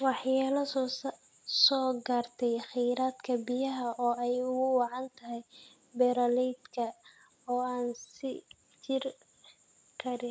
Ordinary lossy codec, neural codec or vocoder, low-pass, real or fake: none; none; none; real